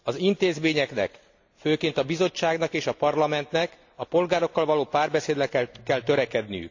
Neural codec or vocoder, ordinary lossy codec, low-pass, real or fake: none; AAC, 48 kbps; 7.2 kHz; real